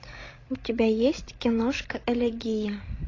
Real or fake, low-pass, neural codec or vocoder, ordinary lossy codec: fake; 7.2 kHz; codec, 16 kHz, 16 kbps, FreqCodec, larger model; AAC, 32 kbps